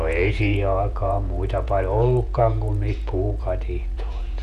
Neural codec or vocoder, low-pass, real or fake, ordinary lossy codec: vocoder, 48 kHz, 128 mel bands, Vocos; 14.4 kHz; fake; none